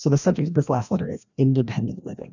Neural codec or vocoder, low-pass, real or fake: codec, 16 kHz, 1 kbps, FreqCodec, larger model; 7.2 kHz; fake